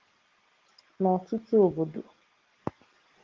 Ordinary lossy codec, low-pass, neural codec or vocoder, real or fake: Opus, 16 kbps; 7.2 kHz; none; real